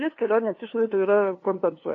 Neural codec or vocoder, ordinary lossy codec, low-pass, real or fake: codec, 16 kHz, 2 kbps, FunCodec, trained on LibriTTS, 25 frames a second; AAC, 32 kbps; 7.2 kHz; fake